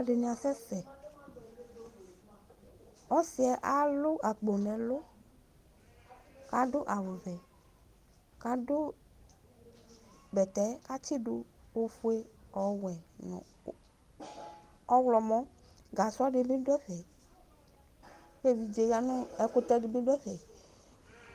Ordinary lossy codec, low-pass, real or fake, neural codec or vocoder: Opus, 24 kbps; 14.4 kHz; real; none